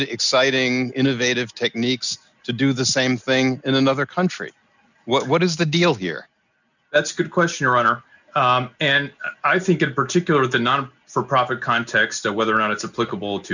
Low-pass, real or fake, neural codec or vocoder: 7.2 kHz; real; none